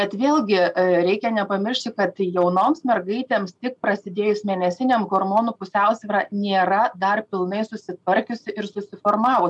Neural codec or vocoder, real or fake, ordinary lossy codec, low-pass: none; real; MP3, 96 kbps; 10.8 kHz